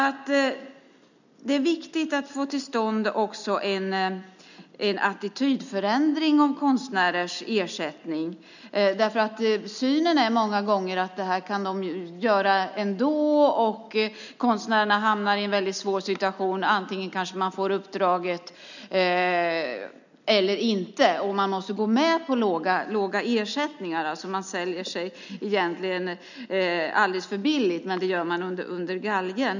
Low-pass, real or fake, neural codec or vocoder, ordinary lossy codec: 7.2 kHz; real; none; none